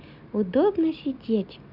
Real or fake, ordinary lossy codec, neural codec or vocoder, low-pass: real; none; none; 5.4 kHz